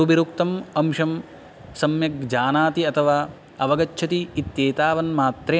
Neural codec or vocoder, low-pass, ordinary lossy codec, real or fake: none; none; none; real